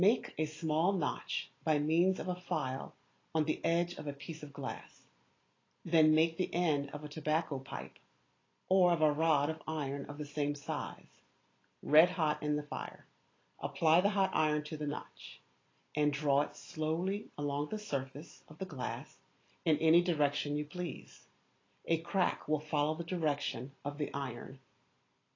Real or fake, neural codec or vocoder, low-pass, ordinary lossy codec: real; none; 7.2 kHz; AAC, 32 kbps